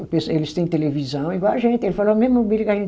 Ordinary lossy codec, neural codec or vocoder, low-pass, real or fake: none; none; none; real